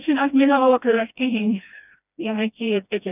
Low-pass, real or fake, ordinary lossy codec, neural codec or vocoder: 3.6 kHz; fake; none; codec, 16 kHz, 1 kbps, FreqCodec, smaller model